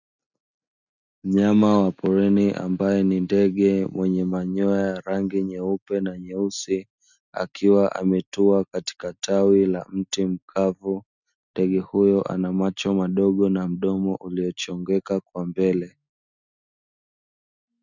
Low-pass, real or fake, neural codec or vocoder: 7.2 kHz; real; none